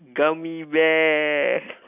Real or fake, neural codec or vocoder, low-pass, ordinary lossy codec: real; none; 3.6 kHz; none